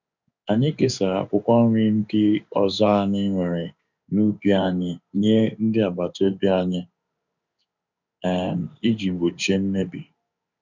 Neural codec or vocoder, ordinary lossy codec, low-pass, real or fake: codec, 16 kHz in and 24 kHz out, 1 kbps, XY-Tokenizer; none; 7.2 kHz; fake